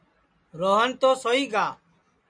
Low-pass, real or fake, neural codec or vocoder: 9.9 kHz; real; none